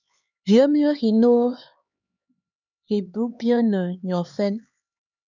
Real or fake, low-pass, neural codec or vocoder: fake; 7.2 kHz; codec, 16 kHz, 4 kbps, X-Codec, HuBERT features, trained on LibriSpeech